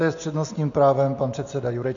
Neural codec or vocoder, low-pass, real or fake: none; 7.2 kHz; real